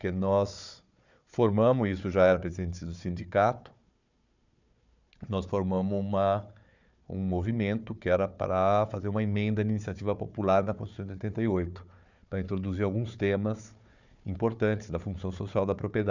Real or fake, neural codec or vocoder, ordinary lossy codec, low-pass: fake; codec, 16 kHz, 4 kbps, FunCodec, trained on Chinese and English, 50 frames a second; none; 7.2 kHz